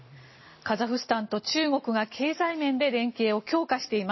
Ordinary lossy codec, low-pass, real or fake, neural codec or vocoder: MP3, 24 kbps; 7.2 kHz; real; none